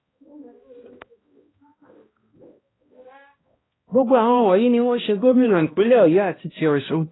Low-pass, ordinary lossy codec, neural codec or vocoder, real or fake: 7.2 kHz; AAC, 16 kbps; codec, 16 kHz, 1 kbps, X-Codec, HuBERT features, trained on balanced general audio; fake